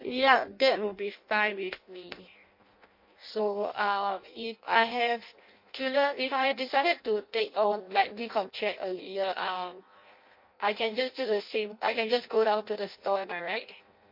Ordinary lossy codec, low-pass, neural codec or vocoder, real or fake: MP3, 32 kbps; 5.4 kHz; codec, 16 kHz in and 24 kHz out, 0.6 kbps, FireRedTTS-2 codec; fake